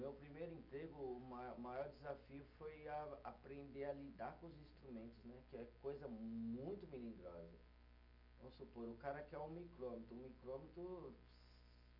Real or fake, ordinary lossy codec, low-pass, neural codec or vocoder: real; none; 5.4 kHz; none